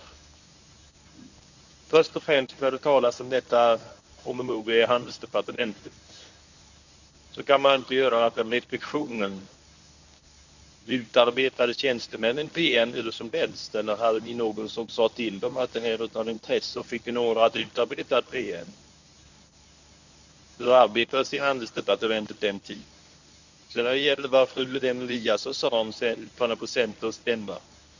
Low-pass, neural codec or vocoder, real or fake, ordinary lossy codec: 7.2 kHz; codec, 24 kHz, 0.9 kbps, WavTokenizer, medium speech release version 1; fake; none